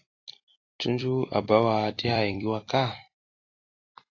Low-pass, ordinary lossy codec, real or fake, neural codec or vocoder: 7.2 kHz; AAC, 32 kbps; fake; vocoder, 44.1 kHz, 128 mel bands every 256 samples, BigVGAN v2